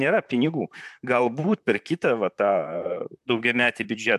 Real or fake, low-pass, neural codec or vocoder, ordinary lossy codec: fake; 14.4 kHz; vocoder, 44.1 kHz, 128 mel bands, Pupu-Vocoder; AAC, 96 kbps